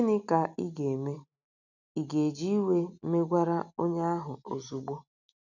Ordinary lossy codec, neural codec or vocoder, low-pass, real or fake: none; none; 7.2 kHz; real